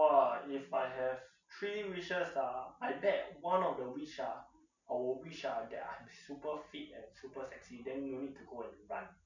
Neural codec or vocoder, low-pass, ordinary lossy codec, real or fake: none; 7.2 kHz; none; real